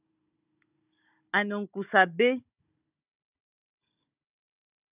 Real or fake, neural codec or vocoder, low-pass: fake; codec, 16 kHz, 16 kbps, FunCodec, trained on Chinese and English, 50 frames a second; 3.6 kHz